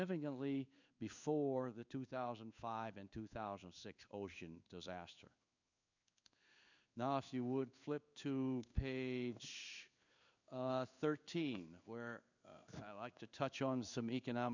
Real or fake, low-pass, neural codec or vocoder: fake; 7.2 kHz; codec, 16 kHz in and 24 kHz out, 1 kbps, XY-Tokenizer